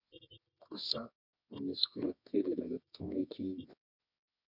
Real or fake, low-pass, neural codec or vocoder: fake; 5.4 kHz; codec, 24 kHz, 0.9 kbps, WavTokenizer, medium music audio release